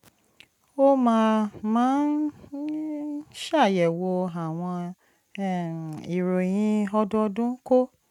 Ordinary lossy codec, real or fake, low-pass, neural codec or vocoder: none; real; 19.8 kHz; none